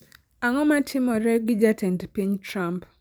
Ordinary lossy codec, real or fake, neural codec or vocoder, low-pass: none; fake; vocoder, 44.1 kHz, 128 mel bands every 512 samples, BigVGAN v2; none